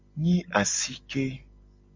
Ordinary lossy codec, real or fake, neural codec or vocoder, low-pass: MP3, 48 kbps; real; none; 7.2 kHz